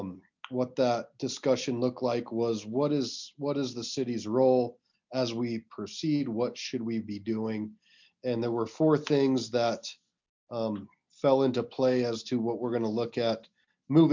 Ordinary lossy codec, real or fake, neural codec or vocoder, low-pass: MP3, 64 kbps; real; none; 7.2 kHz